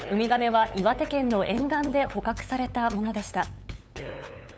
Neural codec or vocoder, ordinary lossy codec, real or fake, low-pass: codec, 16 kHz, 8 kbps, FunCodec, trained on LibriTTS, 25 frames a second; none; fake; none